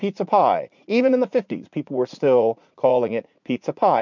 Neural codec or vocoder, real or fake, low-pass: vocoder, 44.1 kHz, 80 mel bands, Vocos; fake; 7.2 kHz